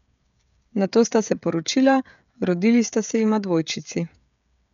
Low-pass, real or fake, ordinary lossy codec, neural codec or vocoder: 7.2 kHz; fake; none; codec, 16 kHz, 16 kbps, FreqCodec, smaller model